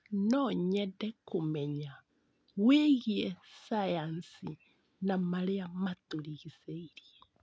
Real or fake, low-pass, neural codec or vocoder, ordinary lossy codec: real; none; none; none